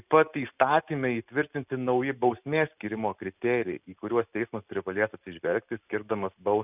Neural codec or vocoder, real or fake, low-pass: none; real; 3.6 kHz